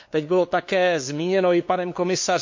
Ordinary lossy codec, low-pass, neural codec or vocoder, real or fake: MP3, 48 kbps; 7.2 kHz; codec, 16 kHz, 2 kbps, FunCodec, trained on LibriTTS, 25 frames a second; fake